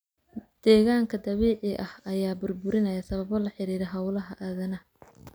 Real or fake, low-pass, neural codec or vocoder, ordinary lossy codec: real; none; none; none